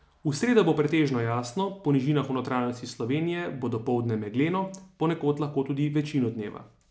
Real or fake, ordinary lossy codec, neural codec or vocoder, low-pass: real; none; none; none